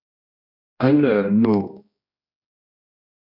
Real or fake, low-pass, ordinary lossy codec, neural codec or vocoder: fake; 5.4 kHz; MP3, 48 kbps; codec, 16 kHz, 1 kbps, X-Codec, HuBERT features, trained on general audio